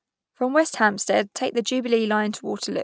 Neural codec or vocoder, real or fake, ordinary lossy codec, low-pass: none; real; none; none